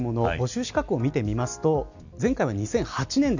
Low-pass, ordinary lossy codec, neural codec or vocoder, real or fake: 7.2 kHz; none; none; real